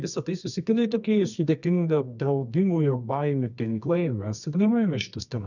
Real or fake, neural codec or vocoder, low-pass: fake; codec, 24 kHz, 0.9 kbps, WavTokenizer, medium music audio release; 7.2 kHz